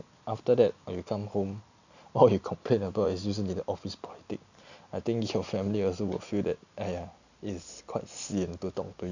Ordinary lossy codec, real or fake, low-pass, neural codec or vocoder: none; real; 7.2 kHz; none